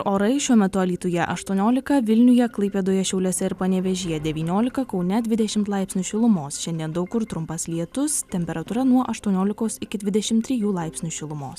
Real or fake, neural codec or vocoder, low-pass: real; none; 14.4 kHz